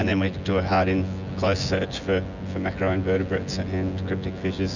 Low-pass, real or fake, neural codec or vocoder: 7.2 kHz; fake; vocoder, 24 kHz, 100 mel bands, Vocos